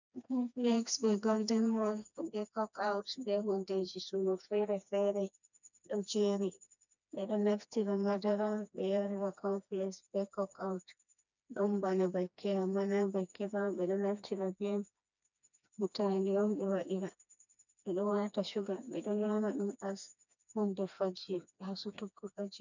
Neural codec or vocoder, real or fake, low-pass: codec, 16 kHz, 2 kbps, FreqCodec, smaller model; fake; 7.2 kHz